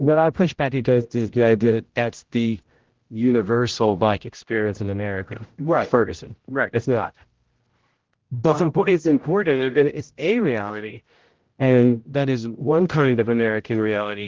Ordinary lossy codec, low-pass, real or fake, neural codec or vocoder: Opus, 16 kbps; 7.2 kHz; fake; codec, 16 kHz, 0.5 kbps, X-Codec, HuBERT features, trained on general audio